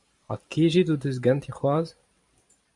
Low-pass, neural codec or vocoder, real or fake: 10.8 kHz; vocoder, 44.1 kHz, 128 mel bands every 512 samples, BigVGAN v2; fake